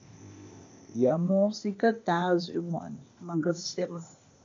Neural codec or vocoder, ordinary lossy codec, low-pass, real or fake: codec, 16 kHz, 0.8 kbps, ZipCodec; MP3, 64 kbps; 7.2 kHz; fake